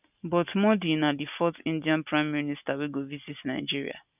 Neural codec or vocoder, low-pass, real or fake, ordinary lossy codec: none; 3.6 kHz; real; none